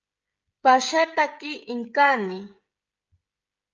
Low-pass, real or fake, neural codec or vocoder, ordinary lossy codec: 7.2 kHz; fake; codec, 16 kHz, 16 kbps, FreqCodec, smaller model; Opus, 24 kbps